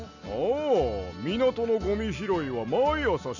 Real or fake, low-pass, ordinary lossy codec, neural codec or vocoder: real; 7.2 kHz; none; none